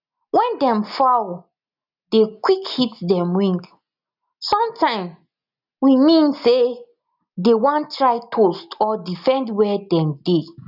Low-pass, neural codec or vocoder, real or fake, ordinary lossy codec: 5.4 kHz; none; real; none